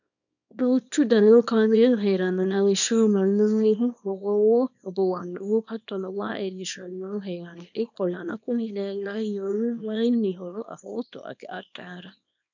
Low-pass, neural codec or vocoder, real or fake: 7.2 kHz; codec, 24 kHz, 0.9 kbps, WavTokenizer, small release; fake